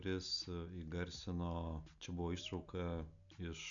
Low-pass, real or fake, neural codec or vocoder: 7.2 kHz; real; none